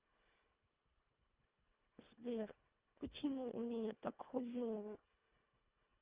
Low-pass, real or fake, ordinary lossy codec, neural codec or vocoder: 3.6 kHz; fake; Opus, 32 kbps; codec, 24 kHz, 1.5 kbps, HILCodec